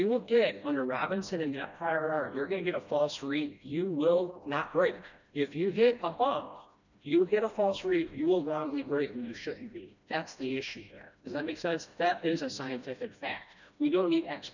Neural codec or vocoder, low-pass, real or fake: codec, 16 kHz, 1 kbps, FreqCodec, smaller model; 7.2 kHz; fake